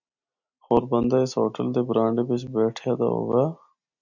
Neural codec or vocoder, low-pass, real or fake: none; 7.2 kHz; real